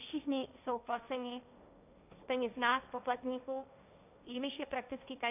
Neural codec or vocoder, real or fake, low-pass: codec, 16 kHz, 1.1 kbps, Voila-Tokenizer; fake; 3.6 kHz